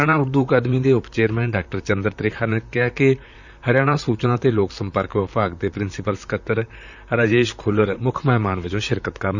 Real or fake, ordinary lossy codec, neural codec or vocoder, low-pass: fake; none; vocoder, 44.1 kHz, 128 mel bands, Pupu-Vocoder; 7.2 kHz